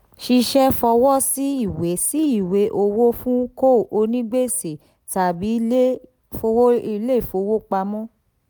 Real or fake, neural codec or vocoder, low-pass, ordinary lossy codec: real; none; none; none